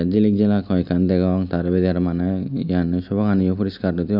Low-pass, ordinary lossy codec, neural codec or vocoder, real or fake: 5.4 kHz; none; none; real